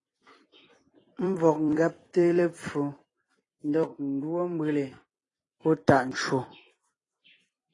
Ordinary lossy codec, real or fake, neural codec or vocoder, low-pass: AAC, 32 kbps; fake; vocoder, 44.1 kHz, 128 mel bands every 256 samples, BigVGAN v2; 10.8 kHz